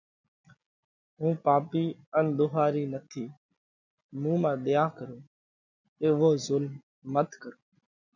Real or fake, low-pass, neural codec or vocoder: real; 7.2 kHz; none